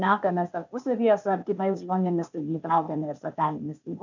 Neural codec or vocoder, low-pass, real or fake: codec, 16 kHz, 0.8 kbps, ZipCodec; 7.2 kHz; fake